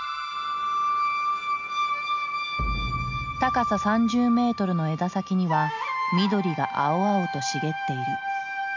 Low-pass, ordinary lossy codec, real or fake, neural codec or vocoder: 7.2 kHz; MP3, 48 kbps; real; none